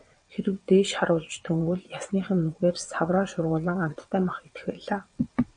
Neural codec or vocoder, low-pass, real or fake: vocoder, 22.05 kHz, 80 mel bands, WaveNeXt; 9.9 kHz; fake